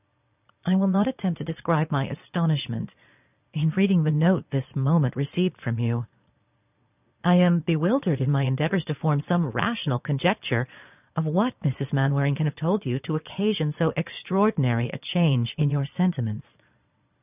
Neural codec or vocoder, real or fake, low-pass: vocoder, 22.05 kHz, 80 mel bands, Vocos; fake; 3.6 kHz